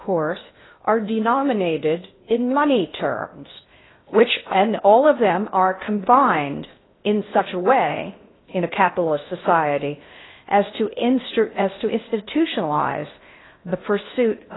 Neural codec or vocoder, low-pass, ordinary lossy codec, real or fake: codec, 16 kHz in and 24 kHz out, 0.6 kbps, FocalCodec, streaming, 2048 codes; 7.2 kHz; AAC, 16 kbps; fake